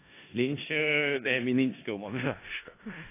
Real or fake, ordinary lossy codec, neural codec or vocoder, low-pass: fake; AAC, 24 kbps; codec, 16 kHz in and 24 kHz out, 0.4 kbps, LongCat-Audio-Codec, four codebook decoder; 3.6 kHz